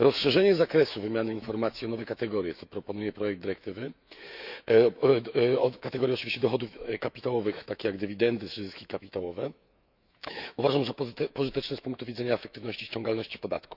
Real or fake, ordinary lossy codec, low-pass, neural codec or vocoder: fake; none; 5.4 kHz; autoencoder, 48 kHz, 128 numbers a frame, DAC-VAE, trained on Japanese speech